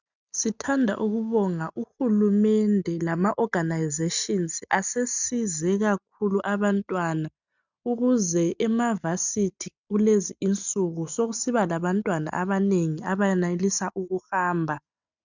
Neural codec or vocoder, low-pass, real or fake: none; 7.2 kHz; real